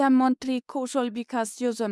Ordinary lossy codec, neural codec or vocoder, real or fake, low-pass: none; codec, 24 kHz, 0.9 kbps, WavTokenizer, medium speech release version 2; fake; none